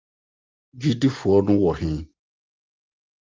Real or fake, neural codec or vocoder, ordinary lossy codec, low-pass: real; none; Opus, 24 kbps; 7.2 kHz